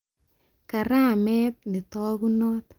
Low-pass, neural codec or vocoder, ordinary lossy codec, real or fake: 19.8 kHz; none; Opus, 24 kbps; real